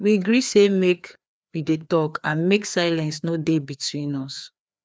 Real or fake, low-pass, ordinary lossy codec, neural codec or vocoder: fake; none; none; codec, 16 kHz, 2 kbps, FreqCodec, larger model